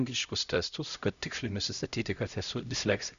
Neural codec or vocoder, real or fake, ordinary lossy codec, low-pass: codec, 16 kHz, 0.5 kbps, X-Codec, HuBERT features, trained on LibriSpeech; fake; MP3, 96 kbps; 7.2 kHz